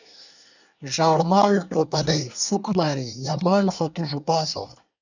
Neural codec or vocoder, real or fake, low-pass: codec, 24 kHz, 1 kbps, SNAC; fake; 7.2 kHz